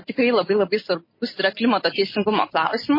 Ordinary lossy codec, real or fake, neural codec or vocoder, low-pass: MP3, 24 kbps; real; none; 5.4 kHz